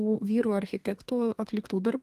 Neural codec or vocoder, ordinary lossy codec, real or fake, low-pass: codec, 32 kHz, 1.9 kbps, SNAC; Opus, 24 kbps; fake; 14.4 kHz